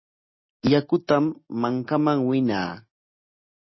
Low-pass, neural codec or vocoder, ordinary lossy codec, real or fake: 7.2 kHz; none; MP3, 24 kbps; real